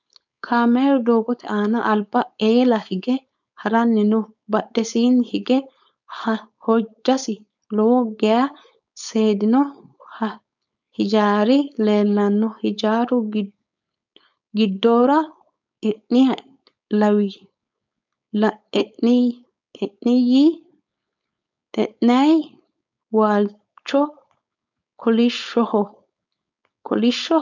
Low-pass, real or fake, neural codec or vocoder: 7.2 kHz; fake; codec, 16 kHz, 4.8 kbps, FACodec